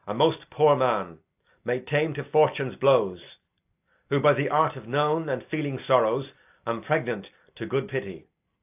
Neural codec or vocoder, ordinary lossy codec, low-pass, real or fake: none; AAC, 32 kbps; 3.6 kHz; real